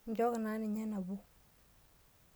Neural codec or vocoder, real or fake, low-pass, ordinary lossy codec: none; real; none; none